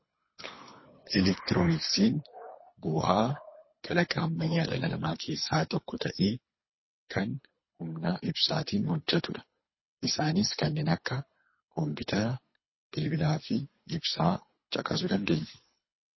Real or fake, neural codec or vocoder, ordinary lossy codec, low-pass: fake; codec, 24 kHz, 3 kbps, HILCodec; MP3, 24 kbps; 7.2 kHz